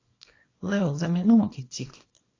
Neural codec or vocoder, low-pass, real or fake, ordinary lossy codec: codec, 24 kHz, 0.9 kbps, WavTokenizer, small release; 7.2 kHz; fake; AAC, 32 kbps